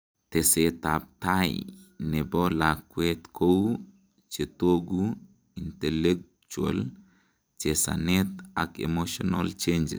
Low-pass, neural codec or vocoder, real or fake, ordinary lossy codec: none; vocoder, 44.1 kHz, 128 mel bands every 256 samples, BigVGAN v2; fake; none